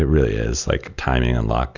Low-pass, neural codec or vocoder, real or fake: 7.2 kHz; none; real